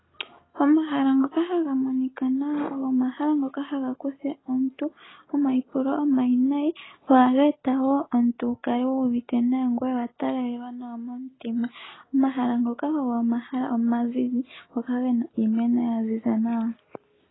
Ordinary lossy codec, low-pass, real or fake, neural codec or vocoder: AAC, 16 kbps; 7.2 kHz; real; none